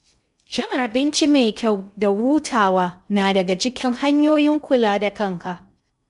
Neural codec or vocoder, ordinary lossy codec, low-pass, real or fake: codec, 16 kHz in and 24 kHz out, 0.6 kbps, FocalCodec, streaming, 2048 codes; none; 10.8 kHz; fake